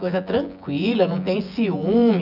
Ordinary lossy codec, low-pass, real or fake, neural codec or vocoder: none; 5.4 kHz; fake; vocoder, 24 kHz, 100 mel bands, Vocos